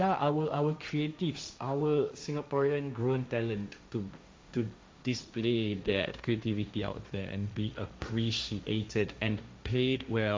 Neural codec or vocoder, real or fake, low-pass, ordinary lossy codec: codec, 16 kHz, 1.1 kbps, Voila-Tokenizer; fake; none; none